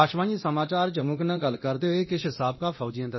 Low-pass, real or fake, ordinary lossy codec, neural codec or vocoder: 7.2 kHz; fake; MP3, 24 kbps; codec, 24 kHz, 0.9 kbps, DualCodec